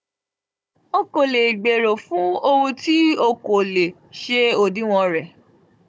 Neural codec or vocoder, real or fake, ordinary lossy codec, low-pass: codec, 16 kHz, 16 kbps, FunCodec, trained on Chinese and English, 50 frames a second; fake; none; none